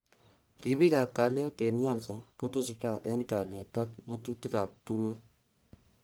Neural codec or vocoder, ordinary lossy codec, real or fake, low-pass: codec, 44.1 kHz, 1.7 kbps, Pupu-Codec; none; fake; none